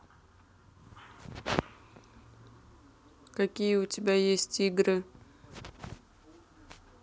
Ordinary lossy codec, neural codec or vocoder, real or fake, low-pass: none; none; real; none